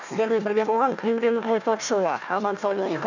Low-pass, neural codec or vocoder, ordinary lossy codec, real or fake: 7.2 kHz; codec, 16 kHz, 1 kbps, FunCodec, trained on Chinese and English, 50 frames a second; none; fake